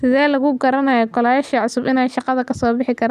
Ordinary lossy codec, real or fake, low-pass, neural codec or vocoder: none; real; 14.4 kHz; none